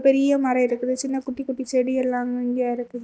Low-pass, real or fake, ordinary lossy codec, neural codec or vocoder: none; real; none; none